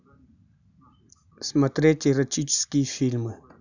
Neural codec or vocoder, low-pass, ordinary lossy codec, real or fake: none; 7.2 kHz; none; real